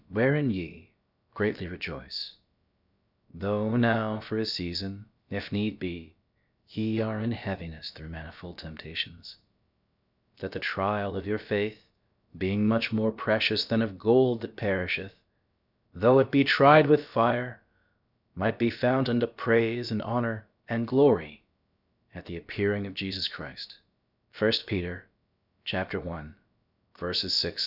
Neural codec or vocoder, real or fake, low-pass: codec, 16 kHz, about 1 kbps, DyCAST, with the encoder's durations; fake; 5.4 kHz